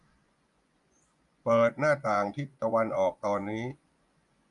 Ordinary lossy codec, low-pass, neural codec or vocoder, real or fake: MP3, 96 kbps; 10.8 kHz; vocoder, 24 kHz, 100 mel bands, Vocos; fake